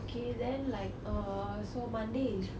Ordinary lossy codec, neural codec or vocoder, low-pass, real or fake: none; none; none; real